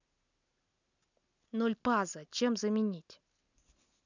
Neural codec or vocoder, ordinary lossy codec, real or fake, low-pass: none; none; real; 7.2 kHz